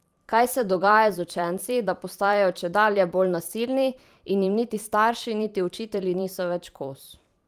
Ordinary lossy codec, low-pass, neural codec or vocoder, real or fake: Opus, 32 kbps; 14.4 kHz; vocoder, 44.1 kHz, 128 mel bands every 512 samples, BigVGAN v2; fake